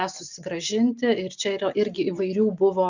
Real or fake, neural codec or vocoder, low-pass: real; none; 7.2 kHz